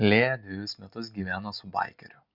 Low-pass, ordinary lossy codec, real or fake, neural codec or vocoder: 5.4 kHz; Opus, 64 kbps; real; none